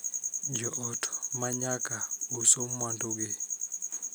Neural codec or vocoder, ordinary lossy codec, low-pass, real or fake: none; none; none; real